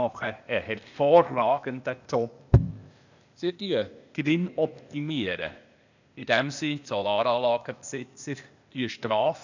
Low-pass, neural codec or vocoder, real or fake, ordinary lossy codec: 7.2 kHz; codec, 16 kHz, 0.8 kbps, ZipCodec; fake; none